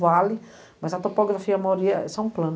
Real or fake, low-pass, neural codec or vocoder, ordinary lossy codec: real; none; none; none